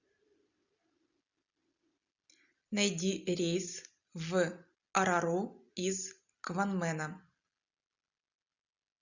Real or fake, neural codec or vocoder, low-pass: real; none; 7.2 kHz